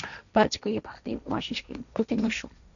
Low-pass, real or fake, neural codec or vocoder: 7.2 kHz; fake; codec, 16 kHz, 1.1 kbps, Voila-Tokenizer